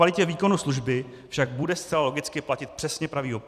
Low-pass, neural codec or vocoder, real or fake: 14.4 kHz; vocoder, 44.1 kHz, 128 mel bands every 256 samples, BigVGAN v2; fake